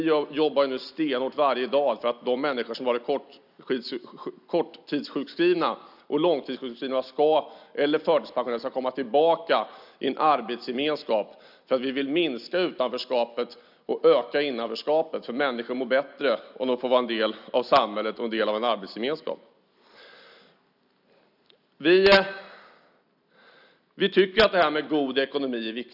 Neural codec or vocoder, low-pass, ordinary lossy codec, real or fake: none; 5.4 kHz; none; real